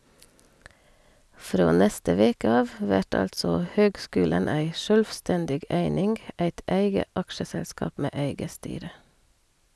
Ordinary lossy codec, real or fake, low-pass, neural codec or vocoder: none; real; none; none